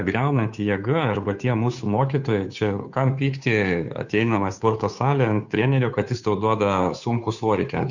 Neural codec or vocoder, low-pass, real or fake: codec, 16 kHz, 2 kbps, FunCodec, trained on Chinese and English, 25 frames a second; 7.2 kHz; fake